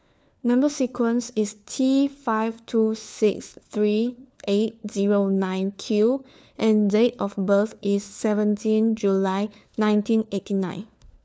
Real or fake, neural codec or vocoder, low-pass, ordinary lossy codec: fake; codec, 16 kHz, 4 kbps, FunCodec, trained on LibriTTS, 50 frames a second; none; none